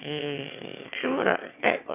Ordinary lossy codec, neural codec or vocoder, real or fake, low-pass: none; autoencoder, 22.05 kHz, a latent of 192 numbers a frame, VITS, trained on one speaker; fake; 3.6 kHz